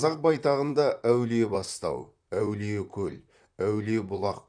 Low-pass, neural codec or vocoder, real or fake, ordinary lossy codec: 9.9 kHz; vocoder, 44.1 kHz, 128 mel bands, Pupu-Vocoder; fake; none